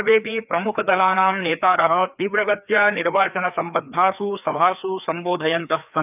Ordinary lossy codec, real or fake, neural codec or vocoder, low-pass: none; fake; codec, 16 kHz, 2 kbps, FreqCodec, larger model; 3.6 kHz